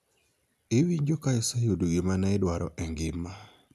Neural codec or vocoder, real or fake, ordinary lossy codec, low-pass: none; real; none; 14.4 kHz